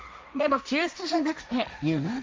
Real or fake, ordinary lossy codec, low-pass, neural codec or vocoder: fake; none; none; codec, 16 kHz, 1.1 kbps, Voila-Tokenizer